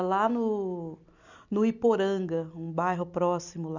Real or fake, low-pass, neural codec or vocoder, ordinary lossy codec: real; 7.2 kHz; none; none